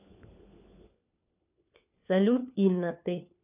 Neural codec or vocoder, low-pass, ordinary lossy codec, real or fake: codec, 16 kHz, 4 kbps, FunCodec, trained on LibriTTS, 50 frames a second; 3.6 kHz; none; fake